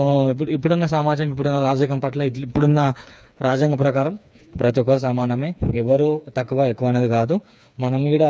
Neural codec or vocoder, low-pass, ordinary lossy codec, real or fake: codec, 16 kHz, 4 kbps, FreqCodec, smaller model; none; none; fake